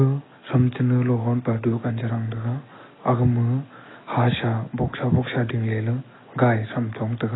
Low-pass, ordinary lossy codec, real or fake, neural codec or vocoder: 7.2 kHz; AAC, 16 kbps; real; none